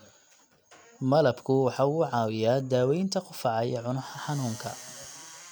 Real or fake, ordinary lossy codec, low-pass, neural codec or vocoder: real; none; none; none